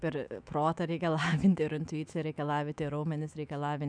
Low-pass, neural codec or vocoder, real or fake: 9.9 kHz; autoencoder, 48 kHz, 128 numbers a frame, DAC-VAE, trained on Japanese speech; fake